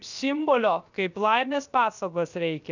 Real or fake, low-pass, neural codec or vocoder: fake; 7.2 kHz; codec, 16 kHz, about 1 kbps, DyCAST, with the encoder's durations